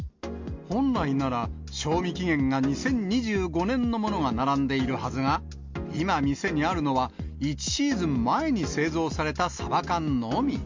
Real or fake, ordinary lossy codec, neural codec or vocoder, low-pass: real; MP3, 64 kbps; none; 7.2 kHz